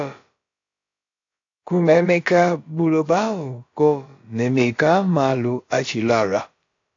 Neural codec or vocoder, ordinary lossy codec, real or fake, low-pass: codec, 16 kHz, about 1 kbps, DyCAST, with the encoder's durations; AAC, 48 kbps; fake; 7.2 kHz